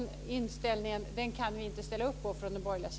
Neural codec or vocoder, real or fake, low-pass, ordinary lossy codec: none; real; none; none